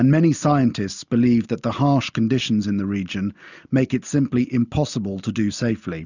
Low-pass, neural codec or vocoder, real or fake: 7.2 kHz; none; real